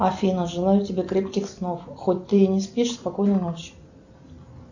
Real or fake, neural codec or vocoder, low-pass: real; none; 7.2 kHz